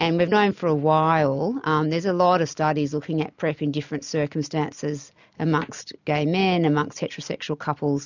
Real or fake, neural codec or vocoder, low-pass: real; none; 7.2 kHz